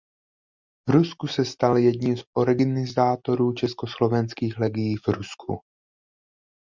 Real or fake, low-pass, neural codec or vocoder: real; 7.2 kHz; none